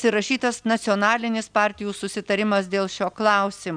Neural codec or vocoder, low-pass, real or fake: none; 9.9 kHz; real